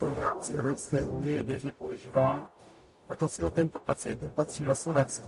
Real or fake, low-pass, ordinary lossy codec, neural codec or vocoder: fake; 14.4 kHz; MP3, 48 kbps; codec, 44.1 kHz, 0.9 kbps, DAC